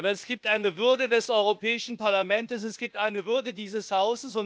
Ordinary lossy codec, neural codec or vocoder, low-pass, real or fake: none; codec, 16 kHz, 0.8 kbps, ZipCodec; none; fake